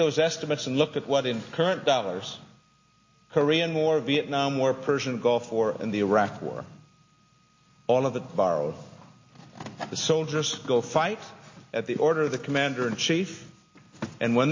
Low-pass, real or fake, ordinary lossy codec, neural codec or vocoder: 7.2 kHz; real; MP3, 32 kbps; none